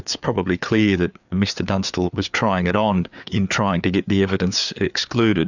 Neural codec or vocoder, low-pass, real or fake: codec, 16 kHz, 4 kbps, FreqCodec, larger model; 7.2 kHz; fake